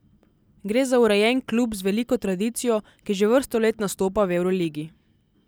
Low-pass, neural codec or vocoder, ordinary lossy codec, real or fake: none; none; none; real